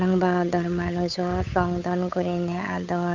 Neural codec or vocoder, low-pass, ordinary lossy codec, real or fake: codec, 16 kHz, 8 kbps, FunCodec, trained on Chinese and English, 25 frames a second; 7.2 kHz; none; fake